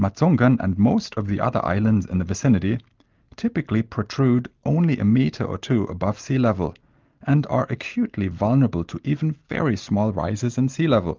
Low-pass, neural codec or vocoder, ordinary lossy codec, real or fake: 7.2 kHz; none; Opus, 24 kbps; real